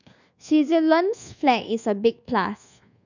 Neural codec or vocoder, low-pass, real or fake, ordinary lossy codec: codec, 24 kHz, 1.2 kbps, DualCodec; 7.2 kHz; fake; none